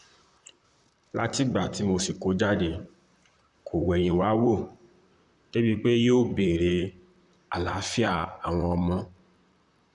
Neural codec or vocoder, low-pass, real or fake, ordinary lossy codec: vocoder, 44.1 kHz, 128 mel bands, Pupu-Vocoder; 10.8 kHz; fake; Opus, 64 kbps